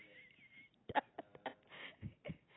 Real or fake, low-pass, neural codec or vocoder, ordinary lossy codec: fake; 7.2 kHz; vocoder, 44.1 kHz, 128 mel bands every 256 samples, BigVGAN v2; AAC, 16 kbps